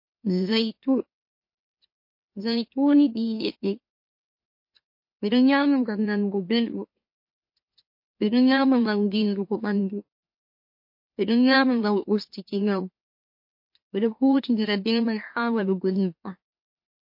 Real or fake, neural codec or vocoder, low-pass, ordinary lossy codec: fake; autoencoder, 44.1 kHz, a latent of 192 numbers a frame, MeloTTS; 5.4 kHz; MP3, 32 kbps